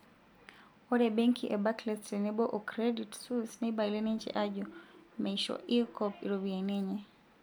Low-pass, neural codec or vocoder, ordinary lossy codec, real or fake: none; none; none; real